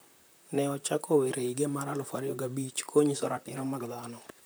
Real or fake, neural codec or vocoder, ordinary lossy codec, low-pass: fake; vocoder, 44.1 kHz, 128 mel bands, Pupu-Vocoder; none; none